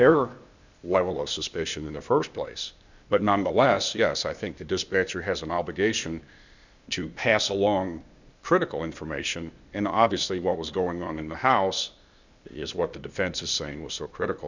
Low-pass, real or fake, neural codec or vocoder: 7.2 kHz; fake; codec, 16 kHz, 0.8 kbps, ZipCodec